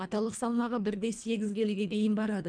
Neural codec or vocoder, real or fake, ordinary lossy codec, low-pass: codec, 24 kHz, 1.5 kbps, HILCodec; fake; none; 9.9 kHz